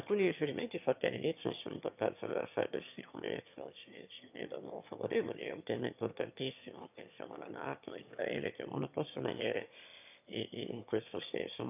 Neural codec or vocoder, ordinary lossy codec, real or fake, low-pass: autoencoder, 22.05 kHz, a latent of 192 numbers a frame, VITS, trained on one speaker; none; fake; 3.6 kHz